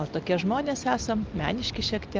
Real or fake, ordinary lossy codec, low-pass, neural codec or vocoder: real; Opus, 32 kbps; 7.2 kHz; none